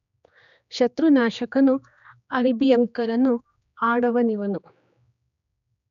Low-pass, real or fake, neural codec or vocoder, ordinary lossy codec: 7.2 kHz; fake; codec, 16 kHz, 2 kbps, X-Codec, HuBERT features, trained on general audio; none